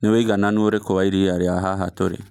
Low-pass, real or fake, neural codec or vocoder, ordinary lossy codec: 19.8 kHz; real; none; none